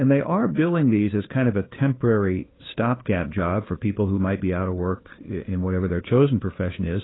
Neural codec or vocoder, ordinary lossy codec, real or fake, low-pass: codec, 16 kHz, 2 kbps, FunCodec, trained on Chinese and English, 25 frames a second; AAC, 16 kbps; fake; 7.2 kHz